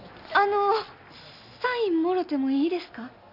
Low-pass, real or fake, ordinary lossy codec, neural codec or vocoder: 5.4 kHz; real; none; none